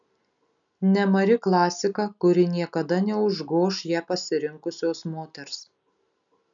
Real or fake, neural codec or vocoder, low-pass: real; none; 7.2 kHz